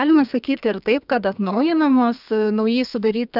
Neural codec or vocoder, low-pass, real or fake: codec, 32 kHz, 1.9 kbps, SNAC; 5.4 kHz; fake